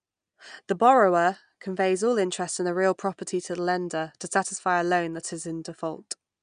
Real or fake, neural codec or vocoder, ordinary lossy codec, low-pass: real; none; none; 9.9 kHz